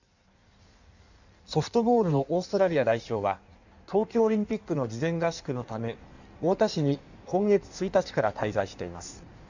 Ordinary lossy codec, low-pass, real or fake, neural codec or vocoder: none; 7.2 kHz; fake; codec, 16 kHz in and 24 kHz out, 1.1 kbps, FireRedTTS-2 codec